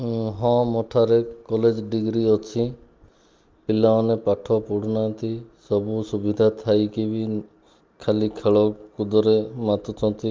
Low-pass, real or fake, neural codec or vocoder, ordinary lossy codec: 7.2 kHz; real; none; Opus, 32 kbps